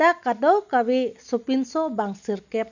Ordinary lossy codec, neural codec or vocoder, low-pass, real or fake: none; none; 7.2 kHz; real